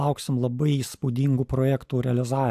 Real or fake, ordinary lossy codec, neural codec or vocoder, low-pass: real; AAC, 96 kbps; none; 14.4 kHz